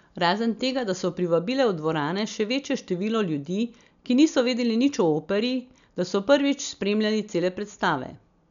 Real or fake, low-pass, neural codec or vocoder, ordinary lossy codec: real; 7.2 kHz; none; none